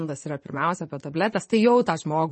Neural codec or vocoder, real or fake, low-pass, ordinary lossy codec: vocoder, 24 kHz, 100 mel bands, Vocos; fake; 10.8 kHz; MP3, 32 kbps